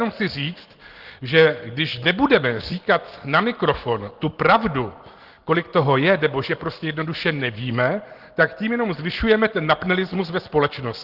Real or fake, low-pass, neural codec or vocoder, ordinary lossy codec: real; 5.4 kHz; none; Opus, 16 kbps